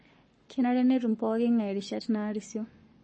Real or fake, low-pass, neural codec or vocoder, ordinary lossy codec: fake; 9.9 kHz; codec, 44.1 kHz, 7.8 kbps, Pupu-Codec; MP3, 32 kbps